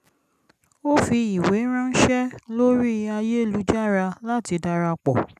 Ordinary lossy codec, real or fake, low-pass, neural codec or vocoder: none; real; 14.4 kHz; none